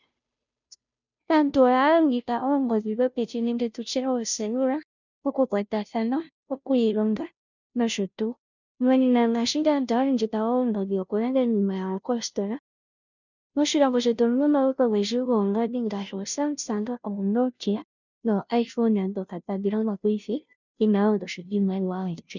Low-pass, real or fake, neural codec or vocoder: 7.2 kHz; fake; codec, 16 kHz, 0.5 kbps, FunCodec, trained on Chinese and English, 25 frames a second